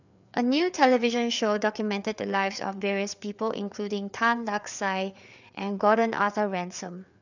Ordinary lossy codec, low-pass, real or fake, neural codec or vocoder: none; 7.2 kHz; fake; codec, 16 kHz, 4 kbps, FreqCodec, larger model